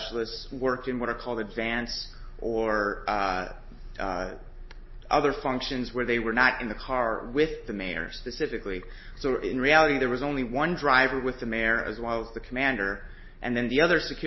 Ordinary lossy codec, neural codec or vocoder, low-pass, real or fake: MP3, 24 kbps; none; 7.2 kHz; real